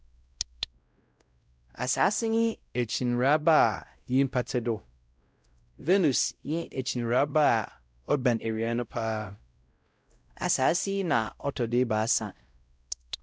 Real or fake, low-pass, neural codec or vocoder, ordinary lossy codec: fake; none; codec, 16 kHz, 0.5 kbps, X-Codec, WavLM features, trained on Multilingual LibriSpeech; none